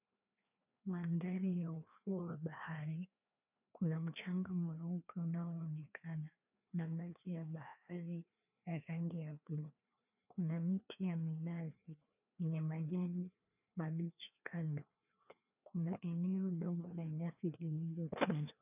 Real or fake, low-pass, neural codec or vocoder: fake; 3.6 kHz; codec, 16 kHz, 2 kbps, FreqCodec, larger model